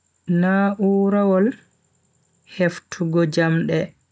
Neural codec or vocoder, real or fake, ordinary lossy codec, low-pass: none; real; none; none